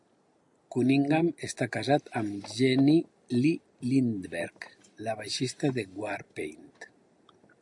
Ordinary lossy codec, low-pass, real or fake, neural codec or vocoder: AAC, 64 kbps; 10.8 kHz; real; none